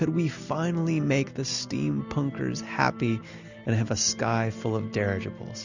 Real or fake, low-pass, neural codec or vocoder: real; 7.2 kHz; none